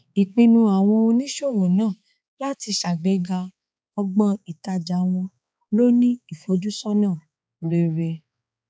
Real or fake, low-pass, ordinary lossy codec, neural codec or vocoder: fake; none; none; codec, 16 kHz, 4 kbps, X-Codec, HuBERT features, trained on balanced general audio